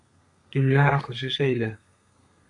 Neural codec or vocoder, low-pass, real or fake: codec, 32 kHz, 1.9 kbps, SNAC; 10.8 kHz; fake